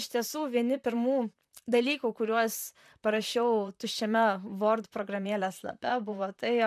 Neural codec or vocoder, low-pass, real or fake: vocoder, 44.1 kHz, 128 mel bands, Pupu-Vocoder; 14.4 kHz; fake